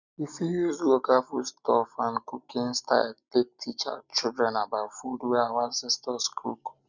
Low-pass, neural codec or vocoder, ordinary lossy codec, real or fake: 7.2 kHz; none; none; real